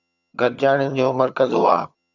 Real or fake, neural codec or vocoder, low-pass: fake; vocoder, 22.05 kHz, 80 mel bands, HiFi-GAN; 7.2 kHz